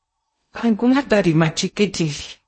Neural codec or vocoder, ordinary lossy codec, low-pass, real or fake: codec, 16 kHz in and 24 kHz out, 0.6 kbps, FocalCodec, streaming, 2048 codes; MP3, 32 kbps; 9.9 kHz; fake